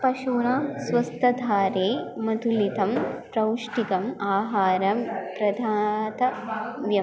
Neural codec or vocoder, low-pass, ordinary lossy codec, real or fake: none; none; none; real